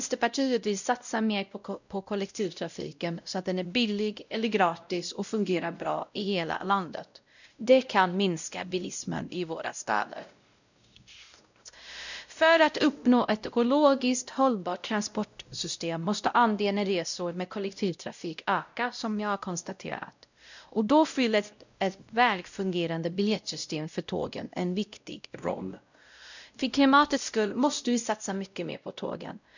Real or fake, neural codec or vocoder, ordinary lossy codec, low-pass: fake; codec, 16 kHz, 0.5 kbps, X-Codec, WavLM features, trained on Multilingual LibriSpeech; none; 7.2 kHz